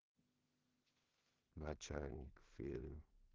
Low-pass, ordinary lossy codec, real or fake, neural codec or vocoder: 7.2 kHz; Opus, 16 kbps; fake; codec, 16 kHz in and 24 kHz out, 0.4 kbps, LongCat-Audio-Codec, two codebook decoder